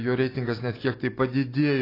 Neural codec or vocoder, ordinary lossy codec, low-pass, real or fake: none; AAC, 24 kbps; 5.4 kHz; real